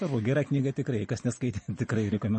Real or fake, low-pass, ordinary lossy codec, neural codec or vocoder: real; 10.8 kHz; MP3, 32 kbps; none